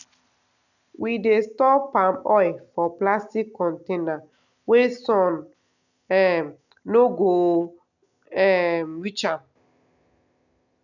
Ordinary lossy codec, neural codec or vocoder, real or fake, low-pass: none; none; real; 7.2 kHz